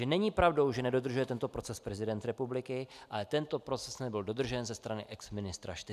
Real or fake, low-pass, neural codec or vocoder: real; 14.4 kHz; none